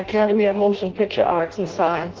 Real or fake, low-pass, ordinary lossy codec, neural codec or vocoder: fake; 7.2 kHz; Opus, 16 kbps; codec, 16 kHz in and 24 kHz out, 0.6 kbps, FireRedTTS-2 codec